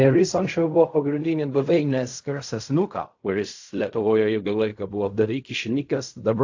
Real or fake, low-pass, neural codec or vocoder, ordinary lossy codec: fake; 7.2 kHz; codec, 16 kHz in and 24 kHz out, 0.4 kbps, LongCat-Audio-Codec, fine tuned four codebook decoder; AAC, 48 kbps